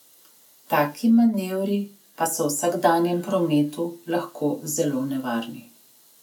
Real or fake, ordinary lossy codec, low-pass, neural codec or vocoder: real; none; 19.8 kHz; none